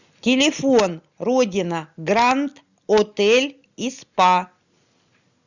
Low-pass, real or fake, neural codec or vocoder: 7.2 kHz; real; none